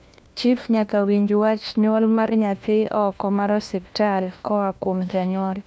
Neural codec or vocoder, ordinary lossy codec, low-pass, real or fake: codec, 16 kHz, 1 kbps, FunCodec, trained on LibriTTS, 50 frames a second; none; none; fake